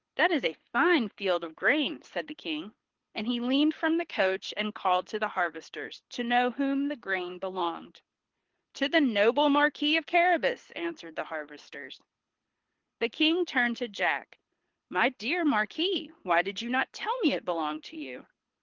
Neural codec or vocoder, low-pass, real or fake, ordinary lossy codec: codec, 24 kHz, 6 kbps, HILCodec; 7.2 kHz; fake; Opus, 16 kbps